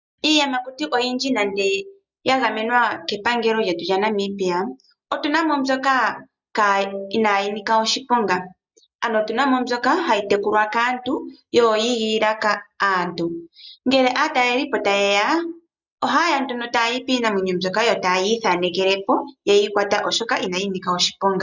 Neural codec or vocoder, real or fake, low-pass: none; real; 7.2 kHz